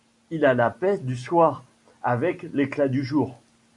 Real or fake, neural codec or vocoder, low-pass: real; none; 10.8 kHz